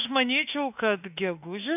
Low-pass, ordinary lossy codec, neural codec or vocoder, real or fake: 3.6 kHz; AAC, 24 kbps; autoencoder, 48 kHz, 32 numbers a frame, DAC-VAE, trained on Japanese speech; fake